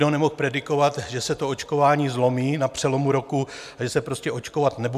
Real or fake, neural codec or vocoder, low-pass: real; none; 14.4 kHz